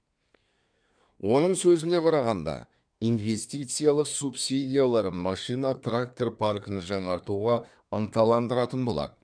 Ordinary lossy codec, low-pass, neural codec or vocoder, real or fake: none; 9.9 kHz; codec, 24 kHz, 1 kbps, SNAC; fake